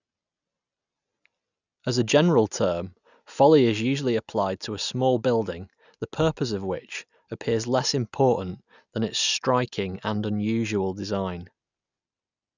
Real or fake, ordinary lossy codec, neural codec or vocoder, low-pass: real; none; none; 7.2 kHz